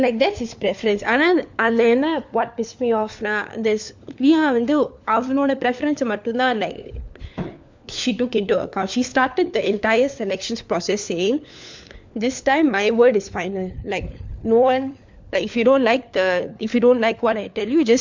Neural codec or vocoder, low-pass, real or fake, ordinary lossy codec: codec, 16 kHz, 4 kbps, FunCodec, trained on LibriTTS, 50 frames a second; 7.2 kHz; fake; MP3, 64 kbps